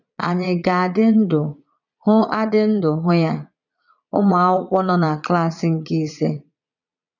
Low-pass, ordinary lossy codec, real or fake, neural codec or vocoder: 7.2 kHz; none; fake; vocoder, 22.05 kHz, 80 mel bands, Vocos